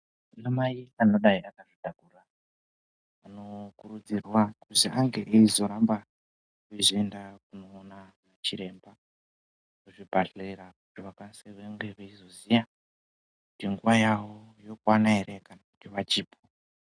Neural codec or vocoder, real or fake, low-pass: none; real; 9.9 kHz